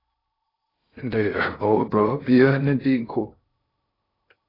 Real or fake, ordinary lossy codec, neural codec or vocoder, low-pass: fake; AAC, 24 kbps; codec, 16 kHz in and 24 kHz out, 0.6 kbps, FocalCodec, streaming, 2048 codes; 5.4 kHz